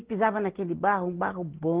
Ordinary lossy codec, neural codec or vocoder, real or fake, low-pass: Opus, 16 kbps; none; real; 3.6 kHz